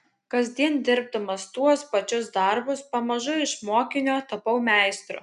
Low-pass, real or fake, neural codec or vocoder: 10.8 kHz; real; none